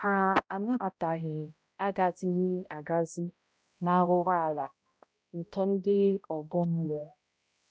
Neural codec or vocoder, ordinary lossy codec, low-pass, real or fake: codec, 16 kHz, 0.5 kbps, X-Codec, HuBERT features, trained on balanced general audio; none; none; fake